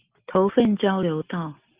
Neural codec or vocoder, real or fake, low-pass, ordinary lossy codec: none; real; 3.6 kHz; Opus, 32 kbps